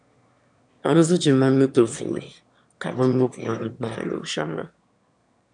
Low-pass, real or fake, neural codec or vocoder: 9.9 kHz; fake; autoencoder, 22.05 kHz, a latent of 192 numbers a frame, VITS, trained on one speaker